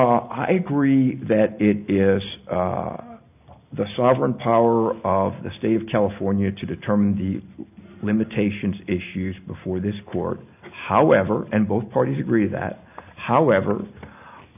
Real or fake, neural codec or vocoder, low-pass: real; none; 3.6 kHz